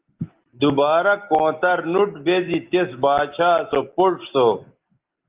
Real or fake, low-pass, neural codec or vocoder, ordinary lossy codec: real; 3.6 kHz; none; Opus, 32 kbps